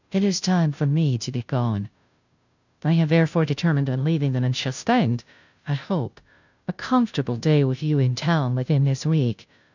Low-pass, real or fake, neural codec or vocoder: 7.2 kHz; fake; codec, 16 kHz, 0.5 kbps, FunCodec, trained on Chinese and English, 25 frames a second